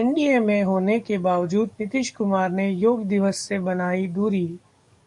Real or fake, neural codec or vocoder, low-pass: fake; codec, 44.1 kHz, 7.8 kbps, DAC; 10.8 kHz